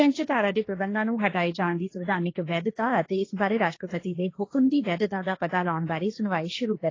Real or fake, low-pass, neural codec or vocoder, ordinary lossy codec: fake; 7.2 kHz; codec, 16 kHz, 1.1 kbps, Voila-Tokenizer; AAC, 32 kbps